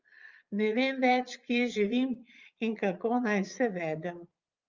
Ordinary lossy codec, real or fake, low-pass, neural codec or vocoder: Opus, 32 kbps; fake; 7.2 kHz; vocoder, 22.05 kHz, 80 mel bands, Vocos